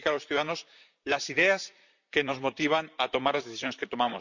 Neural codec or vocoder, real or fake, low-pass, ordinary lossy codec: vocoder, 44.1 kHz, 128 mel bands, Pupu-Vocoder; fake; 7.2 kHz; none